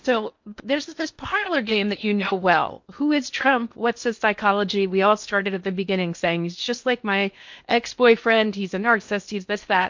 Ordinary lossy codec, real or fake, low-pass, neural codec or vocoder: MP3, 48 kbps; fake; 7.2 kHz; codec, 16 kHz in and 24 kHz out, 0.8 kbps, FocalCodec, streaming, 65536 codes